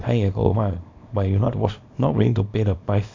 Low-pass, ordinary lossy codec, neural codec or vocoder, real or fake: 7.2 kHz; AAC, 48 kbps; codec, 24 kHz, 0.9 kbps, WavTokenizer, small release; fake